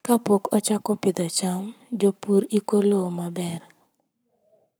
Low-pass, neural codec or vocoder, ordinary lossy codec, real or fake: none; codec, 44.1 kHz, 7.8 kbps, Pupu-Codec; none; fake